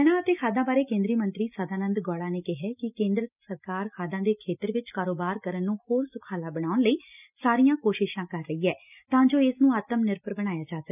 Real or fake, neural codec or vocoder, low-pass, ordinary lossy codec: real; none; 3.6 kHz; none